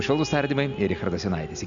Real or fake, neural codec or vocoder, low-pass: real; none; 7.2 kHz